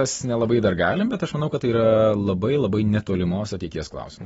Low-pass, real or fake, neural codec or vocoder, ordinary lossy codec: 19.8 kHz; real; none; AAC, 24 kbps